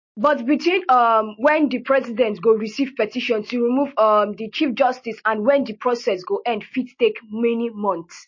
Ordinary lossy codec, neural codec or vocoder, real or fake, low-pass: MP3, 32 kbps; none; real; 7.2 kHz